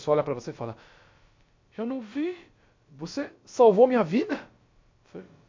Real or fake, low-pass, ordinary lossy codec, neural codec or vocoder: fake; 7.2 kHz; MP3, 48 kbps; codec, 16 kHz, about 1 kbps, DyCAST, with the encoder's durations